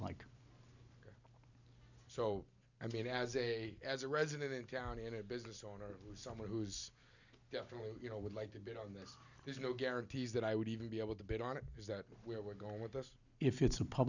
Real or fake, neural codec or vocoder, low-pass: real; none; 7.2 kHz